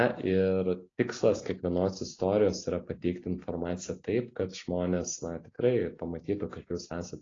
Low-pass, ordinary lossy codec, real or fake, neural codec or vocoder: 7.2 kHz; AAC, 32 kbps; real; none